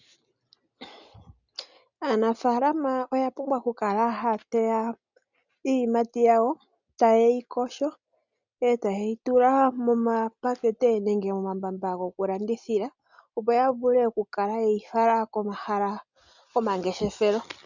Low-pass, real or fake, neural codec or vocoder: 7.2 kHz; real; none